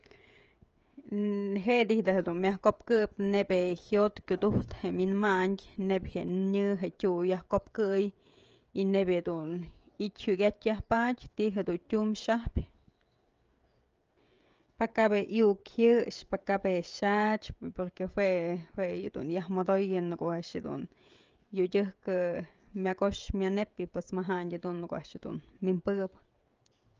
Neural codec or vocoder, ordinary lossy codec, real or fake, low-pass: codec, 16 kHz, 16 kbps, FreqCodec, smaller model; Opus, 24 kbps; fake; 7.2 kHz